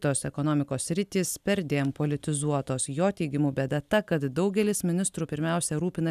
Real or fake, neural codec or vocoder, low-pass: real; none; 14.4 kHz